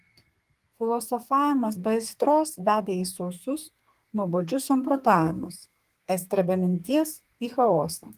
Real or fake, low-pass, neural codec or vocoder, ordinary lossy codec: fake; 14.4 kHz; codec, 32 kHz, 1.9 kbps, SNAC; Opus, 24 kbps